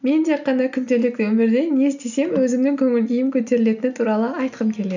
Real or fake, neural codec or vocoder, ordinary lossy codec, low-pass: real; none; none; 7.2 kHz